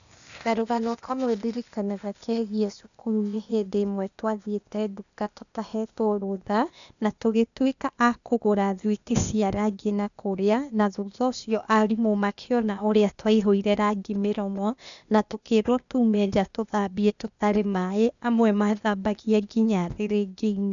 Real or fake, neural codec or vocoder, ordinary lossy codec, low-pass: fake; codec, 16 kHz, 0.8 kbps, ZipCodec; none; 7.2 kHz